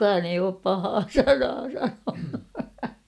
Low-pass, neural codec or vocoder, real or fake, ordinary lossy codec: none; none; real; none